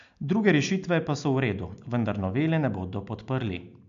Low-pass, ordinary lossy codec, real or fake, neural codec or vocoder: 7.2 kHz; MP3, 64 kbps; real; none